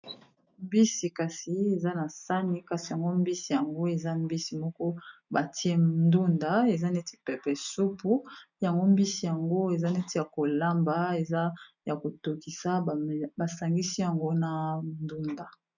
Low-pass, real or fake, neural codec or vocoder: 7.2 kHz; real; none